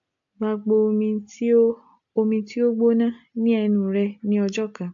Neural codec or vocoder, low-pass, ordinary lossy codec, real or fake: none; 7.2 kHz; AAC, 64 kbps; real